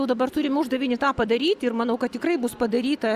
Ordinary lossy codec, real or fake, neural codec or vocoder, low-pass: Opus, 64 kbps; real; none; 14.4 kHz